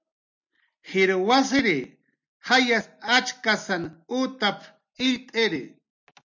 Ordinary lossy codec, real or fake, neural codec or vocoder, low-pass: MP3, 64 kbps; real; none; 7.2 kHz